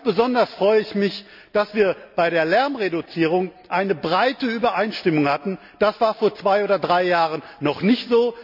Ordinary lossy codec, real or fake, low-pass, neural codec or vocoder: none; real; 5.4 kHz; none